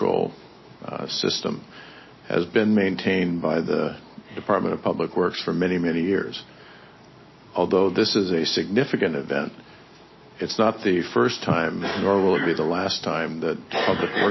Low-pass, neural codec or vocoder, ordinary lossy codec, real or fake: 7.2 kHz; none; MP3, 24 kbps; real